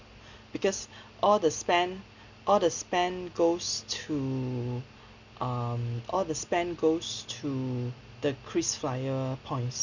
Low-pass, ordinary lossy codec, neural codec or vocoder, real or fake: 7.2 kHz; none; none; real